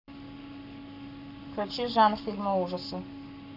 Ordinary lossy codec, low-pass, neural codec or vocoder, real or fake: MP3, 48 kbps; 5.4 kHz; codec, 44.1 kHz, 7.8 kbps, Pupu-Codec; fake